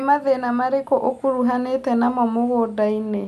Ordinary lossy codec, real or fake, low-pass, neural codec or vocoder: none; real; 14.4 kHz; none